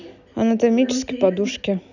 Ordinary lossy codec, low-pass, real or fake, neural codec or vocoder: none; 7.2 kHz; real; none